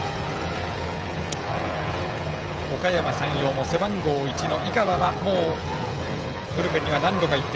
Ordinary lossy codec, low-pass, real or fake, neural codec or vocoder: none; none; fake; codec, 16 kHz, 16 kbps, FreqCodec, smaller model